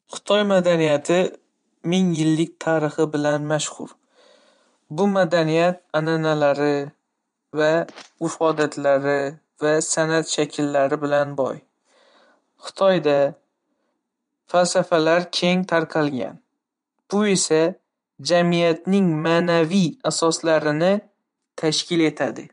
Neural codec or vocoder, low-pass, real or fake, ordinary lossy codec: vocoder, 22.05 kHz, 80 mel bands, Vocos; 9.9 kHz; fake; MP3, 64 kbps